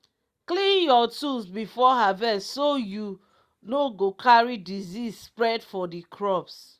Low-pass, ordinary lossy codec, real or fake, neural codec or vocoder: 14.4 kHz; Opus, 64 kbps; real; none